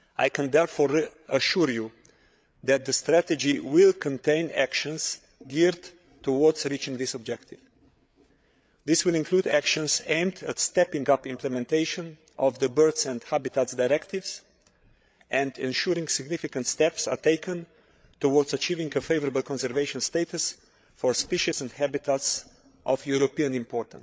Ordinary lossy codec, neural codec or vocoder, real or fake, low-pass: none; codec, 16 kHz, 8 kbps, FreqCodec, larger model; fake; none